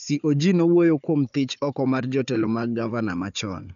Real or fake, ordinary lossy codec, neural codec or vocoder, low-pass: fake; none; codec, 16 kHz, 4 kbps, FunCodec, trained on Chinese and English, 50 frames a second; 7.2 kHz